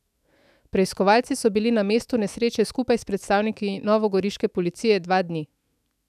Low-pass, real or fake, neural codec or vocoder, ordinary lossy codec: 14.4 kHz; fake; autoencoder, 48 kHz, 128 numbers a frame, DAC-VAE, trained on Japanese speech; none